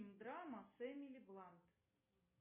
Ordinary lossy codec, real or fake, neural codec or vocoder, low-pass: MP3, 16 kbps; real; none; 3.6 kHz